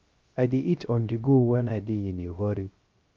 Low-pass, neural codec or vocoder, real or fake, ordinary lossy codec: 7.2 kHz; codec, 16 kHz, 0.3 kbps, FocalCodec; fake; Opus, 32 kbps